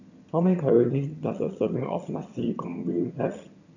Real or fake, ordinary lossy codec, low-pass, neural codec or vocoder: fake; none; 7.2 kHz; vocoder, 22.05 kHz, 80 mel bands, HiFi-GAN